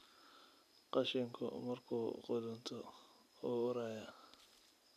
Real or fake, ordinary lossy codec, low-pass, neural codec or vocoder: real; none; 14.4 kHz; none